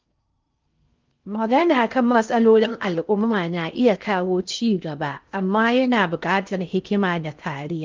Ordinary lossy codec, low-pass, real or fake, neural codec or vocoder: Opus, 24 kbps; 7.2 kHz; fake; codec, 16 kHz in and 24 kHz out, 0.6 kbps, FocalCodec, streaming, 4096 codes